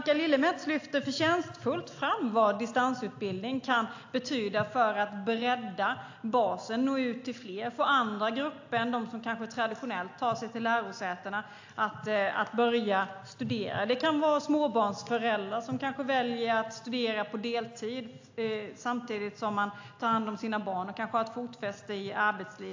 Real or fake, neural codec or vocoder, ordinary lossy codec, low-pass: real; none; AAC, 48 kbps; 7.2 kHz